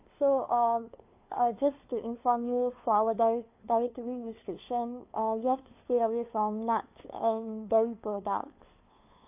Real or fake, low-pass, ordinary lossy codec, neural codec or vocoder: fake; 3.6 kHz; AAC, 32 kbps; codec, 16 kHz, 2 kbps, FunCodec, trained on LibriTTS, 25 frames a second